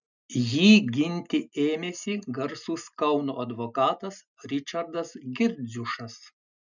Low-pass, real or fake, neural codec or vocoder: 7.2 kHz; real; none